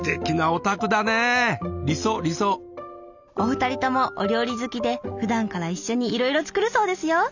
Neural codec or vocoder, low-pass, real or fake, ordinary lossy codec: none; 7.2 kHz; real; none